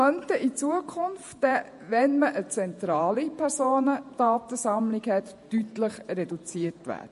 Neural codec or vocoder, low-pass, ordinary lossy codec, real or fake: vocoder, 48 kHz, 128 mel bands, Vocos; 14.4 kHz; MP3, 48 kbps; fake